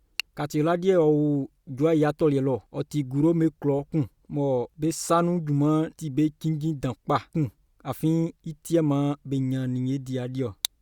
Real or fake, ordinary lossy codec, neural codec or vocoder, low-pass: real; Opus, 64 kbps; none; 19.8 kHz